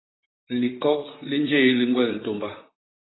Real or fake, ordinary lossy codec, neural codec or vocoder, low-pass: fake; AAC, 16 kbps; vocoder, 44.1 kHz, 128 mel bands, Pupu-Vocoder; 7.2 kHz